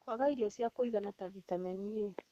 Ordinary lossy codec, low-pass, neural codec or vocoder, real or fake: Opus, 64 kbps; 14.4 kHz; codec, 32 kHz, 1.9 kbps, SNAC; fake